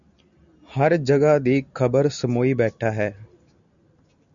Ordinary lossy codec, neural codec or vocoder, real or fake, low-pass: MP3, 96 kbps; none; real; 7.2 kHz